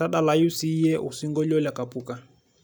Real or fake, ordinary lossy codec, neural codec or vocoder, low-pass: real; none; none; none